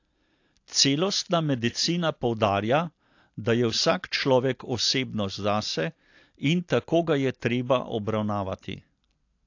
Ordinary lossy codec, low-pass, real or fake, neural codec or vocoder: AAC, 48 kbps; 7.2 kHz; real; none